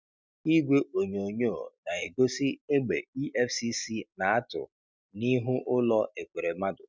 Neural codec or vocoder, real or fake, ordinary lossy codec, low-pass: none; real; none; none